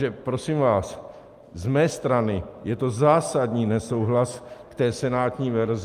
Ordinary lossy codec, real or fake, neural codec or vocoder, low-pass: Opus, 32 kbps; real; none; 14.4 kHz